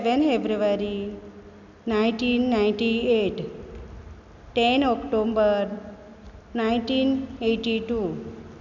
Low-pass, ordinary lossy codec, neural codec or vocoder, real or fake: 7.2 kHz; none; none; real